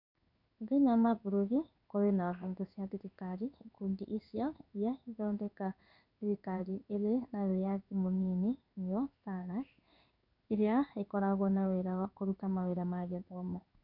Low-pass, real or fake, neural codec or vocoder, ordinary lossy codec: 5.4 kHz; fake; codec, 16 kHz in and 24 kHz out, 1 kbps, XY-Tokenizer; none